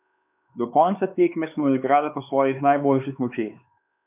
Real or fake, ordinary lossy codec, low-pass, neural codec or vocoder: fake; none; 3.6 kHz; codec, 16 kHz, 4 kbps, X-Codec, HuBERT features, trained on LibriSpeech